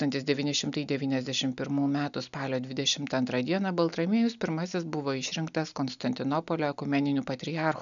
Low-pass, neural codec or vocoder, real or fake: 7.2 kHz; none; real